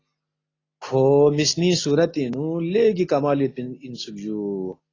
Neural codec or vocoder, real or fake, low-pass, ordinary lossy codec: none; real; 7.2 kHz; AAC, 32 kbps